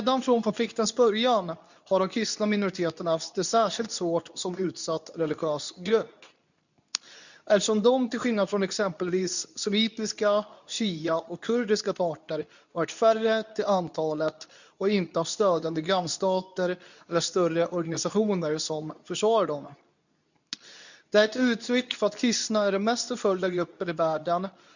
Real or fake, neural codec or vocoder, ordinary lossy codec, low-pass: fake; codec, 24 kHz, 0.9 kbps, WavTokenizer, medium speech release version 2; none; 7.2 kHz